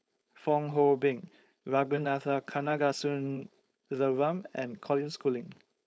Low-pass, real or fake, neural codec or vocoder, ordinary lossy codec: none; fake; codec, 16 kHz, 4.8 kbps, FACodec; none